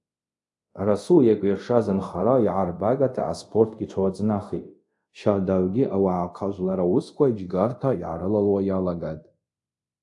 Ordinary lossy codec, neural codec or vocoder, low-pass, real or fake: AAC, 64 kbps; codec, 24 kHz, 0.5 kbps, DualCodec; 10.8 kHz; fake